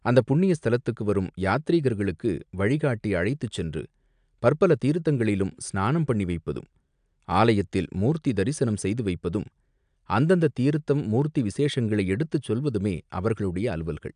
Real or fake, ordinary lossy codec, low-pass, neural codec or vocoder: real; AAC, 96 kbps; 9.9 kHz; none